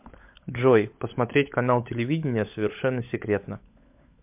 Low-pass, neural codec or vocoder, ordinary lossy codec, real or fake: 3.6 kHz; codec, 16 kHz, 16 kbps, FunCodec, trained on LibriTTS, 50 frames a second; MP3, 32 kbps; fake